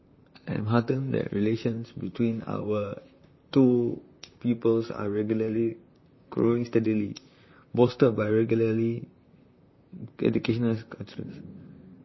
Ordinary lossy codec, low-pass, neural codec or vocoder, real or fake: MP3, 24 kbps; 7.2 kHz; vocoder, 44.1 kHz, 128 mel bands, Pupu-Vocoder; fake